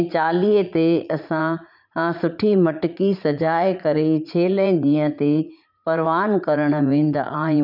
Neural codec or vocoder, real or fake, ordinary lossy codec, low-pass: vocoder, 44.1 kHz, 80 mel bands, Vocos; fake; none; 5.4 kHz